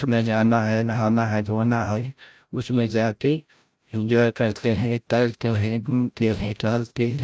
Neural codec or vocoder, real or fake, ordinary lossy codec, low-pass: codec, 16 kHz, 0.5 kbps, FreqCodec, larger model; fake; none; none